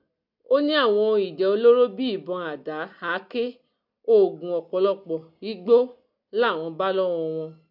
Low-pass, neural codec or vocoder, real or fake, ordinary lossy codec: 5.4 kHz; none; real; none